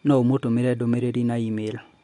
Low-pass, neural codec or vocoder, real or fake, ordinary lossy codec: 10.8 kHz; none; real; MP3, 64 kbps